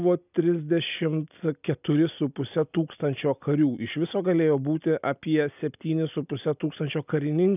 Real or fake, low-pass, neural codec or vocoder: real; 3.6 kHz; none